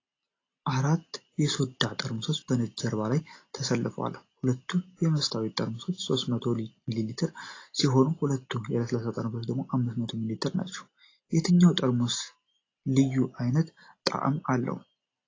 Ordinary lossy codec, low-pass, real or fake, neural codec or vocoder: AAC, 32 kbps; 7.2 kHz; real; none